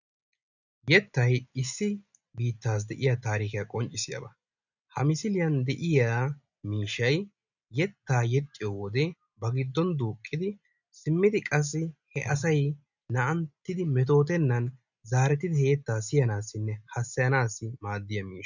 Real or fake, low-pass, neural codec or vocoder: real; 7.2 kHz; none